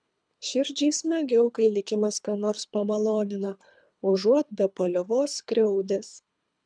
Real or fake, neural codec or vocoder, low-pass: fake; codec, 24 kHz, 3 kbps, HILCodec; 9.9 kHz